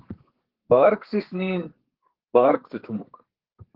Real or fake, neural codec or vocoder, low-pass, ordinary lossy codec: fake; codec, 32 kHz, 1.9 kbps, SNAC; 5.4 kHz; Opus, 16 kbps